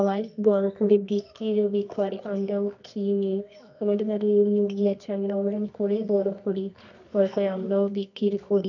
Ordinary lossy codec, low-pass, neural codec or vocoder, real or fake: none; 7.2 kHz; codec, 24 kHz, 0.9 kbps, WavTokenizer, medium music audio release; fake